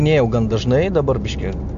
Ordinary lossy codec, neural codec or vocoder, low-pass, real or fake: AAC, 64 kbps; none; 7.2 kHz; real